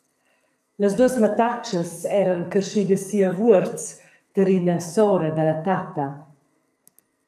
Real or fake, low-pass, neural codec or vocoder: fake; 14.4 kHz; codec, 44.1 kHz, 2.6 kbps, SNAC